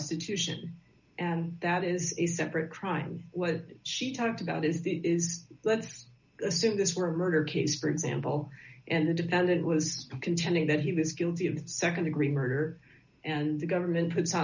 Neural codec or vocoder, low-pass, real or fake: none; 7.2 kHz; real